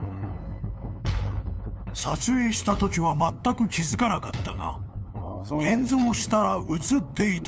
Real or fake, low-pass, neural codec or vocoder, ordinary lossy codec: fake; none; codec, 16 kHz, 2 kbps, FunCodec, trained on LibriTTS, 25 frames a second; none